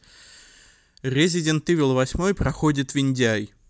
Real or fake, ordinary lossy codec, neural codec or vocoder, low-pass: real; none; none; none